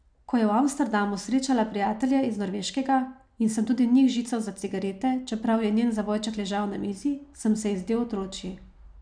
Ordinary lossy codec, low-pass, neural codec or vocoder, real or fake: none; 9.9 kHz; none; real